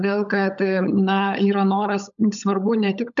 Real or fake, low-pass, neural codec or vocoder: fake; 7.2 kHz; codec, 16 kHz, 16 kbps, FunCodec, trained on LibriTTS, 50 frames a second